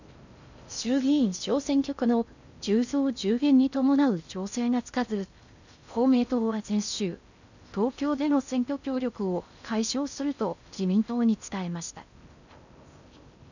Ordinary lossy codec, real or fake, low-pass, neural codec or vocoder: none; fake; 7.2 kHz; codec, 16 kHz in and 24 kHz out, 0.6 kbps, FocalCodec, streaming, 4096 codes